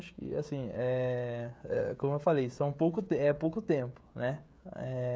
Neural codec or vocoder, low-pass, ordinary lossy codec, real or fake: codec, 16 kHz, 16 kbps, FreqCodec, smaller model; none; none; fake